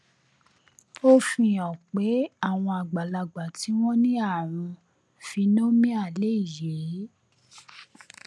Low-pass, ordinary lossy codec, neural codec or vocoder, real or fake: none; none; none; real